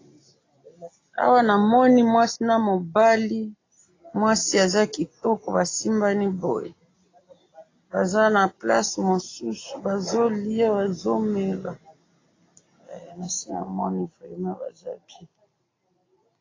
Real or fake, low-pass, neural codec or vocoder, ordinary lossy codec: real; 7.2 kHz; none; AAC, 32 kbps